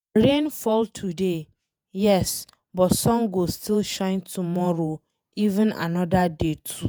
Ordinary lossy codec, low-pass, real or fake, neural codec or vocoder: none; none; fake; vocoder, 48 kHz, 128 mel bands, Vocos